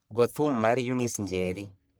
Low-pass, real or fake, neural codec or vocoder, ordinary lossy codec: none; fake; codec, 44.1 kHz, 1.7 kbps, Pupu-Codec; none